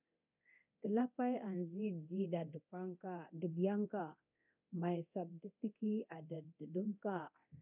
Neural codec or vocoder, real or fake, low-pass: codec, 24 kHz, 0.9 kbps, DualCodec; fake; 3.6 kHz